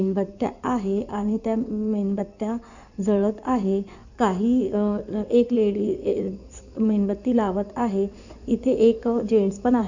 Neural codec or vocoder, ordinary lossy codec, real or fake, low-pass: codec, 16 kHz in and 24 kHz out, 2.2 kbps, FireRedTTS-2 codec; none; fake; 7.2 kHz